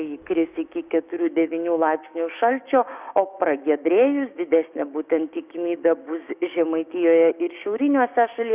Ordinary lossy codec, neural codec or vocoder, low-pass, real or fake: Opus, 64 kbps; codec, 16 kHz, 6 kbps, DAC; 3.6 kHz; fake